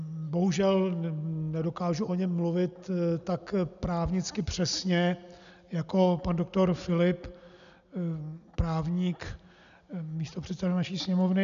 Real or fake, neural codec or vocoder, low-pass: real; none; 7.2 kHz